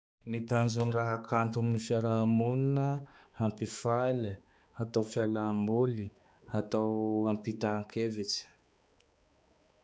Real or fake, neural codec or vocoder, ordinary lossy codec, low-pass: fake; codec, 16 kHz, 2 kbps, X-Codec, HuBERT features, trained on balanced general audio; none; none